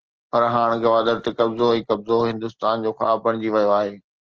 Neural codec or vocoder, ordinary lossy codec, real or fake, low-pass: none; Opus, 16 kbps; real; 7.2 kHz